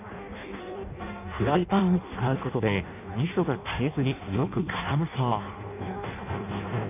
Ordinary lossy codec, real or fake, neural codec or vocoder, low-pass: none; fake; codec, 16 kHz in and 24 kHz out, 0.6 kbps, FireRedTTS-2 codec; 3.6 kHz